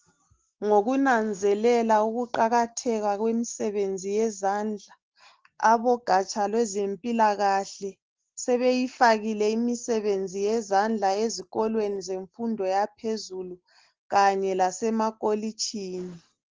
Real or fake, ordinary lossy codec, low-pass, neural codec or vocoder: real; Opus, 16 kbps; 7.2 kHz; none